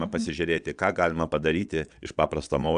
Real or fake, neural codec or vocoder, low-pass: fake; vocoder, 22.05 kHz, 80 mel bands, Vocos; 9.9 kHz